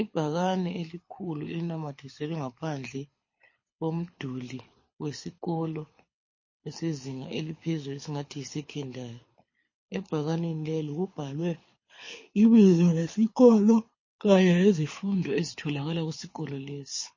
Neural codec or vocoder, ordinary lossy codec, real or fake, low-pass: codec, 24 kHz, 6 kbps, HILCodec; MP3, 32 kbps; fake; 7.2 kHz